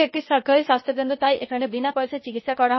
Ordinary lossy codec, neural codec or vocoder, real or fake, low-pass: MP3, 24 kbps; codec, 16 kHz, 0.8 kbps, ZipCodec; fake; 7.2 kHz